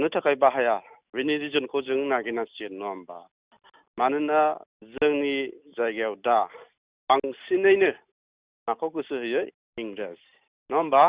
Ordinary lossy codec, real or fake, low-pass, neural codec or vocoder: Opus, 64 kbps; real; 3.6 kHz; none